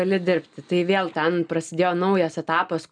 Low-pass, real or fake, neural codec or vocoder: 9.9 kHz; real; none